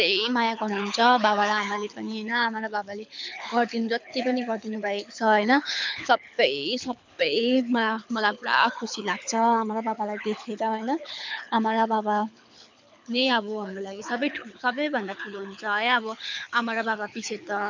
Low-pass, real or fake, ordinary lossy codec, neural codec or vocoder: 7.2 kHz; fake; MP3, 64 kbps; codec, 24 kHz, 6 kbps, HILCodec